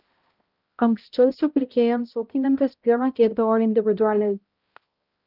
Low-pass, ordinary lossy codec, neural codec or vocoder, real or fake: 5.4 kHz; Opus, 24 kbps; codec, 16 kHz, 0.5 kbps, X-Codec, HuBERT features, trained on balanced general audio; fake